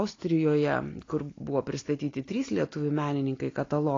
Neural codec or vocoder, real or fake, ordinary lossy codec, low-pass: none; real; AAC, 32 kbps; 7.2 kHz